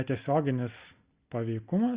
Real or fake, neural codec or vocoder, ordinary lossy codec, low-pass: real; none; Opus, 64 kbps; 3.6 kHz